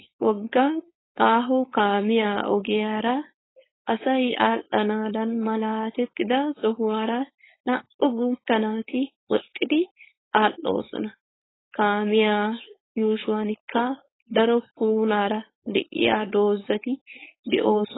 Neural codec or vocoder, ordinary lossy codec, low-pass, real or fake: codec, 16 kHz, 4.8 kbps, FACodec; AAC, 16 kbps; 7.2 kHz; fake